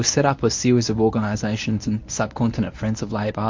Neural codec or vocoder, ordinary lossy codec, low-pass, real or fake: codec, 24 kHz, 0.9 kbps, WavTokenizer, medium speech release version 1; MP3, 48 kbps; 7.2 kHz; fake